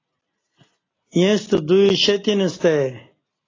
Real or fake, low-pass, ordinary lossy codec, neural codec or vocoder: real; 7.2 kHz; AAC, 32 kbps; none